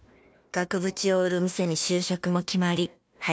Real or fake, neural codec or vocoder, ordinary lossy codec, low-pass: fake; codec, 16 kHz, 1 kbps, FunCodec, trained on Chinese and English, 50 frames a second; none; none